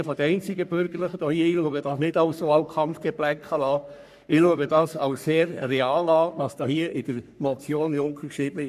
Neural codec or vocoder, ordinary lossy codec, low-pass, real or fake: codec, 44.1 kHz, 3.4 kbps, Pupu-Codec; none; 14.4 kHz; fake